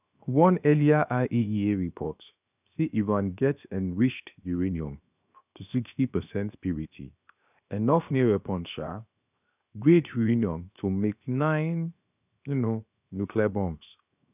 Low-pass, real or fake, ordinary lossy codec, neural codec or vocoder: 3.6 kHz; fake; none; codec, 16 kHz, 0.7 kbps, FocalCodec